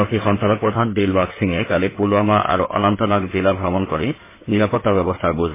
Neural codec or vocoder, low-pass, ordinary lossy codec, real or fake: codec, 16 kHz in and 24 kHz out, 2.2 kbps, FireRedTTS-2 codec; 3.6 kHz; MP3, 16 kbps; fake